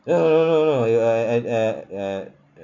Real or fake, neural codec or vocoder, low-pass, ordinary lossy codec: real; none; 7.2 kHz; none